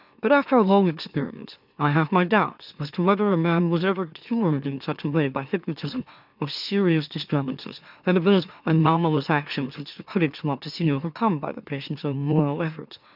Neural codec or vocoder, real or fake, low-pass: autoencoder, 44.1 kHz, a latent of 192 numbers a frame, MeloTTS; fake; 5.4 kHz